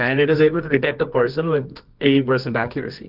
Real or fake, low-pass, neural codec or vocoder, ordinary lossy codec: fake; 5.4 kHz; codec, 24 kHz, 0.9 kbps, WavTokenizer, medium music audio release; Opus, 32 kbps